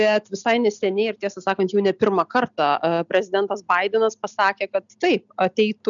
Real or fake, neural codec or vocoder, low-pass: real; none; 7.2 kHz